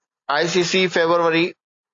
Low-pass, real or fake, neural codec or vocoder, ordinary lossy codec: 7.2 kHz; real; none; AAC, 48 kbps